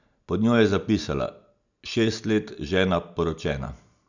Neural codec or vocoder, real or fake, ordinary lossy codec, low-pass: none; real; none; 7.2 kHz